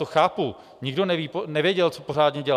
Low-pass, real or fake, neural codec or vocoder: 14.4 kHz; real; none